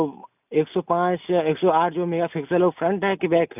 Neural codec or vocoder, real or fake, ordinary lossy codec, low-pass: none; real; none; 3.6 kHz